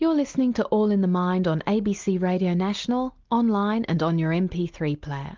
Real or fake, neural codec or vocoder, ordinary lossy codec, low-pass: real; none; Opus, 32 kbps; 7.2 kHz